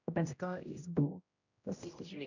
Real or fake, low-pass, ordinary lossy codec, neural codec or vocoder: fake; 7.2 kHz; none; codec, 16 kHz, 0.5 kbps, X-Codec, HuBERT features, trained on general audio